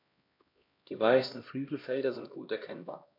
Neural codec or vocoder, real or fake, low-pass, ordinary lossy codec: codec, 16 kHz, 1 kbps, X-Codec, HuBERT features, trained on LibriSpeech; fake; 5.4 kHz; MP3, 48 kbps